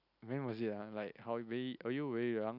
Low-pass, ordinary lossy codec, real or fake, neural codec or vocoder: 5.4 kHz; none; real; none